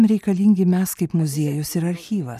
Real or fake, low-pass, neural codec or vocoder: real; 14.4 kHz; none